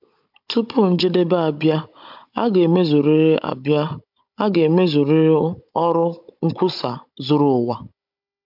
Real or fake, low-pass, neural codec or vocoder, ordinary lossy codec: fake; 5.4 kHz; codec, 16 kHz, 16 kbps, FunCodec, trained on Chinese and English, 50 frames a second; MP3, 48 kbps